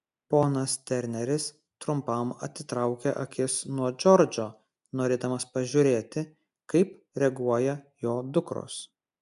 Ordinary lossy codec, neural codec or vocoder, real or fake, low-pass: AAC, 96 kbps; none; real; 10.8 kHz